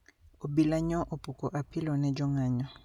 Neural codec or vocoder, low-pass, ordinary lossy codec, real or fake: none; 19.8 kHz; none; real